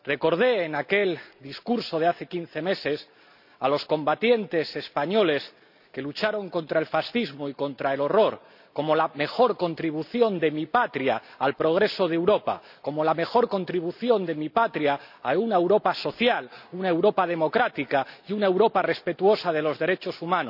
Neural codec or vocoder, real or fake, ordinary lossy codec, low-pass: none; real; none; 5.4 kHz